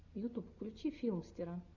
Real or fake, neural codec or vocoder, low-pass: real; none; 7.2 kHz